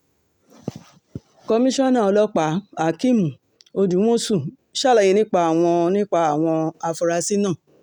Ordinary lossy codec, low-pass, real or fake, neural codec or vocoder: none; 19.8 kHz; real; none